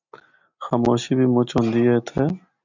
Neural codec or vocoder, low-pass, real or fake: none; 7.2 kHz; real